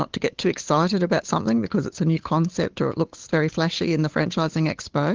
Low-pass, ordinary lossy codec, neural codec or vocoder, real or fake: 7.2 kHz; Opus, 16 kbps; codec, 16 kHz, 8 kbps, FunCodec, trained on Chinese and English, 25 frames a second; fake